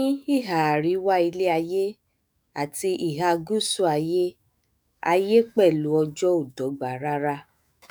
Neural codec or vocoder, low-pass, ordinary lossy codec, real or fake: autoencoder, 48 kHz, 128 numbers a frame, DAC-VAE, trained on Japanese speech; none; none; fake